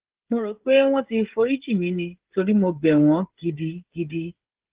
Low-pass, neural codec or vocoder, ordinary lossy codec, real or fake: 3.6 kHz; codec, 16 kHz, 16 kbps, FreqCodec, smaller model; Opus, 16 kbps; fake